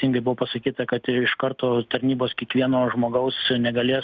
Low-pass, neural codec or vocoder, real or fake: 7.2 kHz; none; real